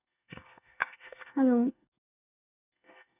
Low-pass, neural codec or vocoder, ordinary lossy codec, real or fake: 3.6 kHz; codec, 24 kHz, 1 kbps, SNAC; none; fake